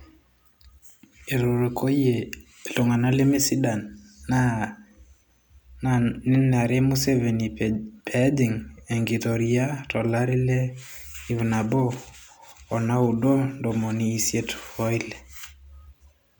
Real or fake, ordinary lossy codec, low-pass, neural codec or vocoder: real; none; none; none